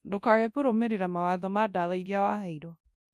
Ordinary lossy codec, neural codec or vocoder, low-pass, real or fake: none; codec, 24 kHz, 0.9 kbps, WavTokenizer, large speech release; none; fake